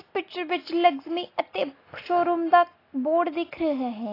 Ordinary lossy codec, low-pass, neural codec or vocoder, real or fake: AAC, 24 kbps; 5.4 kHz; none; real